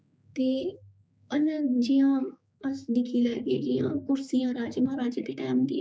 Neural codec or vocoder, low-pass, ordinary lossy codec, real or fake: codec, 16 kHz, 4 kbps, X-Codec, HuBERT features, trained on general audio; none; none; fake